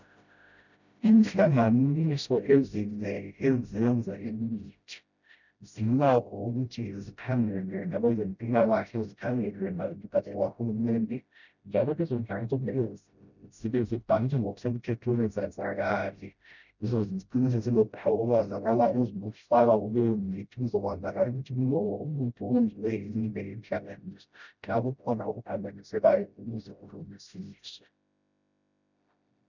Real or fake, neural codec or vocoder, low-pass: fake; codec, 16 kHz, 0.5 kbps, FreqCodec, smaller model; 7.2 kHz